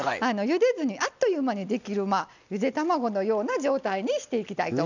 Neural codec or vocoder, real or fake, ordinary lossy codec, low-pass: none; real; none; 7.2 kHz